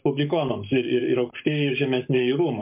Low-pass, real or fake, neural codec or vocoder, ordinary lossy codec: 3.6 kHz; fake; codec, 44.1 kHz, 7.8 kbps, Pupu-Codec; MP3, 32 kbps